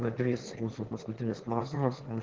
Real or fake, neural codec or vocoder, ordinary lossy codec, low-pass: fake; autoencoder, 22.05 kHz, a latent of 192 numbers a frame, VITS, trained on one speaker; Opus, 16 kbps; 7.2 kHz